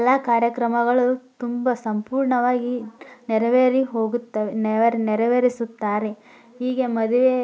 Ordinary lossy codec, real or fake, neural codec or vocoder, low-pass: none; real; none; none